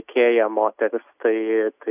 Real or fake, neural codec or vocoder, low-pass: real; none; 3.6 kHz